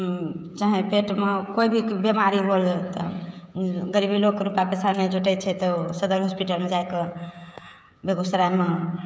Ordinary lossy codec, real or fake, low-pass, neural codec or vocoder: none; fake; none; codec, 16 kHz, 16 kbps, FreqCodec, smaller model